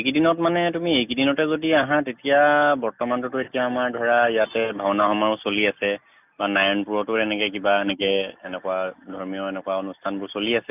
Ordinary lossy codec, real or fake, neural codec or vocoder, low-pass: none; real; none; 3.6 kHz